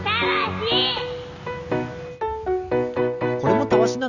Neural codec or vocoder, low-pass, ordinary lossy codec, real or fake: none; 7.2 kHz; none; real